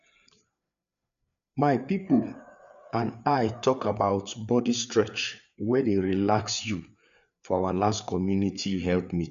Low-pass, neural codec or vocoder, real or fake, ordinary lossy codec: 7.2 kHz; codec, 16 kHz, 4 kbps, FreqCodec, larger model; fake; none